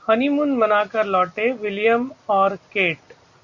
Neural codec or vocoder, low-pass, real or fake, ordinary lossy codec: none; 7.2 kHz; real; Opus, 64 kbps